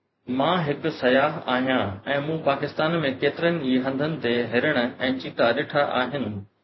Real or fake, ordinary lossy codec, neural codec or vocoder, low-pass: fake; MP3, 24 kbps; vocoder, 44.1 kHz, 128 mel bands every 512 samples, BigVGAN v2; 7.2 kHz